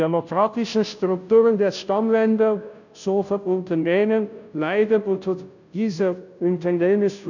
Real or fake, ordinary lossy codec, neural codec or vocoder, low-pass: fake; none; codec, 16 kHz, 0.5 kbps, FunCodec, trained on Chinese and English, 25 frames a second; 7.2 kHz